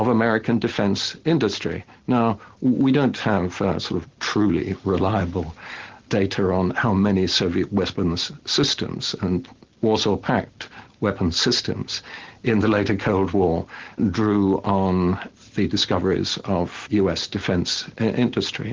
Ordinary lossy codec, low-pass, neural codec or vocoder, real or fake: Opus, 16 kbps; 7.2 kHz; none; real